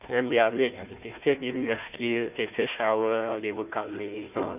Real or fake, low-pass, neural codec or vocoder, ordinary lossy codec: fake; 3.6 kHz; codec, 16 kHz, 1 kbps, FunCodec, trained on Chinese and English, 50 frames a second; none